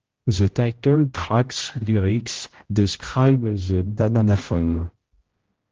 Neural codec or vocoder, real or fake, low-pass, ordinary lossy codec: codec, 16 kHz, 0.5 kbps, X-Codec, HuBERT features, trained on general audio; fake; 7.2 kHz; Opus, 16 kbps